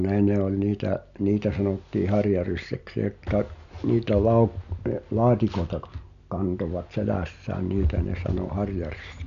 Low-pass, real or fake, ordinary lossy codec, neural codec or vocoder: 7.2 kHz; real; MP3, 96 kbps; none